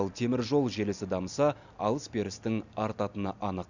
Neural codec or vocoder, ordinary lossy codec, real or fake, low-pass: none; none; real; 7.2 kHz